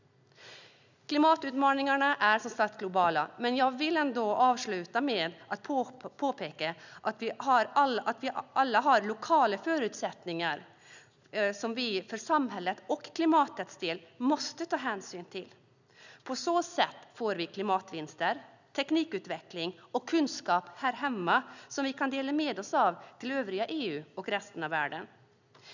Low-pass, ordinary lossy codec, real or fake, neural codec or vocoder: 7.2 kHz; none; real; none